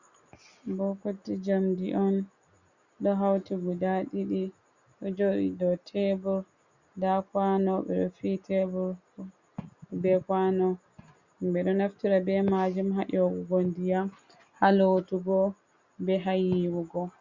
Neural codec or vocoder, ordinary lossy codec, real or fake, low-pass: none; Opus, 64 kbps; real; 7.2 kHz